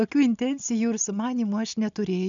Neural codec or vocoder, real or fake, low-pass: none; real; 7.2 kHz